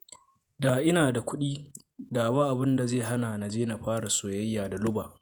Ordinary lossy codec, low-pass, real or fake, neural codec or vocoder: none; none; fake; vocoder, 48 kHz, 128 mel bands, Vocos